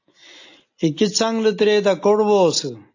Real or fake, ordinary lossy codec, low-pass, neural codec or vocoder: real; AAC, 48 kbps; 7.2 kHz; none